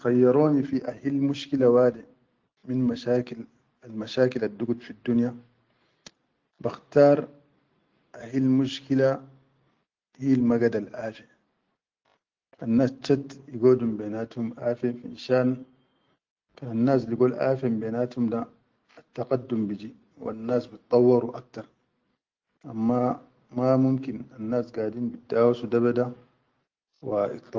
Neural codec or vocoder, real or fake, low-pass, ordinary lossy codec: none; real; 7.2 kHz; Opus, 32 kbps